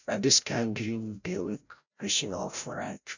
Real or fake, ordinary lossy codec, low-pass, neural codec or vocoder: fake; none; 7.2 kHz; codec, 16 kHz, 0.5 kbps, FreqCodec, larger model